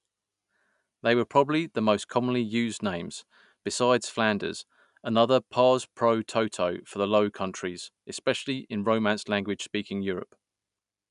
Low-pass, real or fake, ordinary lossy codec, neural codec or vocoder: 10.8 kHz; real; none; none